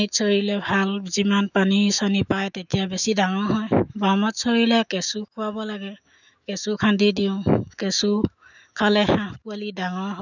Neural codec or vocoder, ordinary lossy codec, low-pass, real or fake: autoencoder, 48 kHz, 128 numbers a frame, DAC-VAE, trained on Japanese speech; none; 7.2 kHz; fake